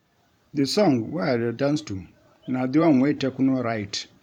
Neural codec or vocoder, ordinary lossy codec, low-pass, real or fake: vocoder, 44.1 kHz, 128 mel bands every 512 samples, BigVGAN v2; none; 19.8 kHz; fake